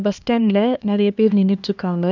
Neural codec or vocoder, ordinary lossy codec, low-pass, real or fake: codec, 16 kHz, 2 kbps, X-Codec, HuBERT features, trained on LibriSpeech; none; 7.2 kHz; fake